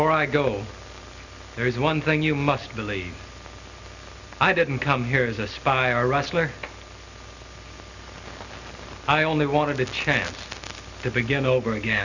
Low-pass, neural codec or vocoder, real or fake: 7.2 kHz; none; real